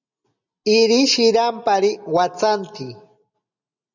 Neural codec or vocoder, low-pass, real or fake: none; 7.2 kHz; real